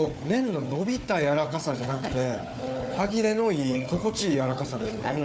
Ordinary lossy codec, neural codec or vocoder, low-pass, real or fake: none; codec, 16 kHz, 4 kbps, FunCodec, trained on Chinese and English, 50 frames a second; none; fake